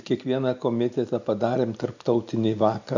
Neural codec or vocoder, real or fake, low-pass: none; real; 7.2 kHz